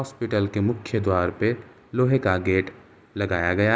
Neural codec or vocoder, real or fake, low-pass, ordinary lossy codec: none; real; none; none